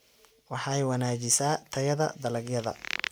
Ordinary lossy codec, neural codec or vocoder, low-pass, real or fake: none; none; none; real